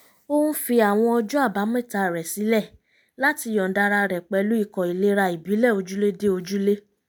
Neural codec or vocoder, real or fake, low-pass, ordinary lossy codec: none; real; none; none